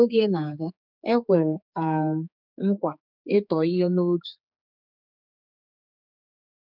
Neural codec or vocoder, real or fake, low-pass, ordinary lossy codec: codec, 16 kHz, 4 kbps, X-Codec, HuBERT features, trained on general audio; fake; 5.4 kHz; none